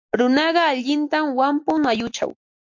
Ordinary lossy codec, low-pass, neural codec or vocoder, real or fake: MP3, 48 kbps; 7.2 kHz; none; real